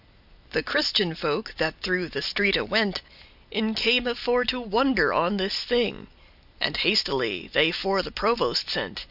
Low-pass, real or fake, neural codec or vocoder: 5.4 kHz; real; none